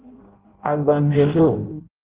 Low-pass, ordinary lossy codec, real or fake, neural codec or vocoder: 3.6 kHz; Opus, 16 kbps; fake; codec, 16 kHz in and 24 kHz out, 0.6 kbps, FireRedTTS-2 codec